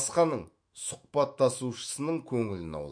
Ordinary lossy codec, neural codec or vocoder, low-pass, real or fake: MP3, 48 kbps; autoencoder, 48 kHz, 128 numbers a frame, DAC-VAE, trained on Japanese speech; 9.9 kHz; fake